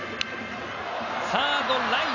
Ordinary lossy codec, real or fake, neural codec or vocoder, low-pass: none; real; none; 7.2 kHz